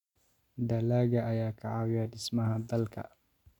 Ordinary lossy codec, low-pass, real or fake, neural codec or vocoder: none; 19.8 kHz; real; none